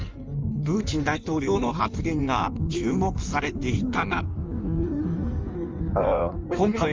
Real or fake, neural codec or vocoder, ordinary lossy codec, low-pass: fake; codec, 16 kHz in and 24 kHz out, 1.1 kbps, FireRedTTS-2 codec; Opus, 32 kbps; 7.2 kHz